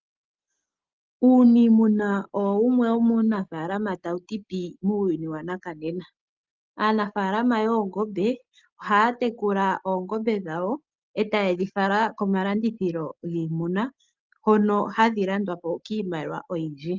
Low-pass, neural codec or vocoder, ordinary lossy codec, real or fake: 7.2 kHz; none; Opus, 32 kbps; real